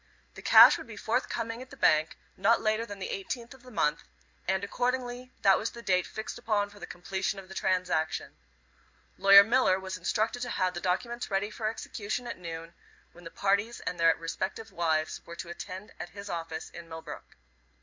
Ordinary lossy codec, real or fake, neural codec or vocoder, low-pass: MP3, 64 kbps; real; none; 7.2 kHz